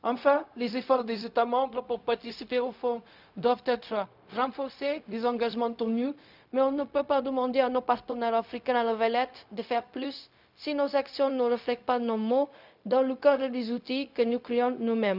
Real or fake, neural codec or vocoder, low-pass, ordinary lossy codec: fake; codec, 16 kHz, 0.4 kbps, LongCat-Audio-Codec; 5.4 kHz; none